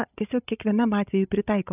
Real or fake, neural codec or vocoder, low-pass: fake; codec, 16 kHz, 16 kbps, FreqCodec, larger model; 3.6 kHz